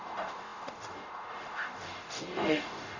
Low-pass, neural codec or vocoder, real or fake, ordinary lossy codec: 7.2 kHz; codec, 44.1 kHz, 0.9 kbps, DAC; fake; none